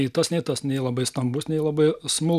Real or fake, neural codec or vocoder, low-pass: real; none; 14.4 kHz